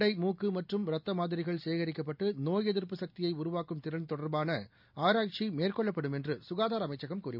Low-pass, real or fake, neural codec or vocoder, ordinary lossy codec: 5.4 kHz; real; none; none